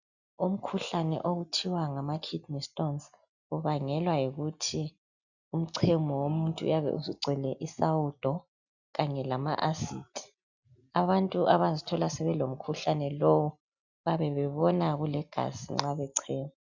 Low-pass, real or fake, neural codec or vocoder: 7.2 kHz; real; none